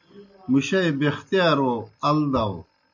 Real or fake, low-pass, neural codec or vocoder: real; 7.2 kHz; none